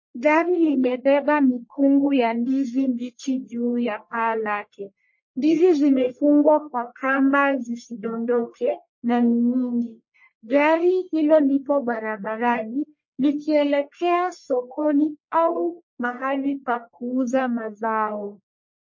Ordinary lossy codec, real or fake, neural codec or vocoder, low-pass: MP3, 32 kbps; fake; codec, 44.1 kHz, 1.7 kbps, Pupu-Codec; 7.2 kHz